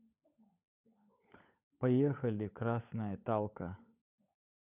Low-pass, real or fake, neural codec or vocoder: 3.6 kHz; fake; codec, 16 kHz, 16 kbps, FunCodec, trained on LibriTTS, 50 frames a second